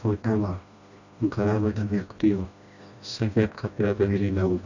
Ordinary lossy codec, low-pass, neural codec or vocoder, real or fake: none; 7.2 kHz; codec, 16 kHz, 1 kbps, FreqCodec, smaller model; fake